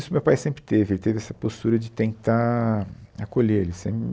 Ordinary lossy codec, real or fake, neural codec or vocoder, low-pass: none; real; none; none